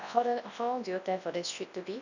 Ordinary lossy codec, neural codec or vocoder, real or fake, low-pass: none; codec, 24 kHz, 0.9 kbps, WavTokenizer, large speech release; fake; 7.2 kHz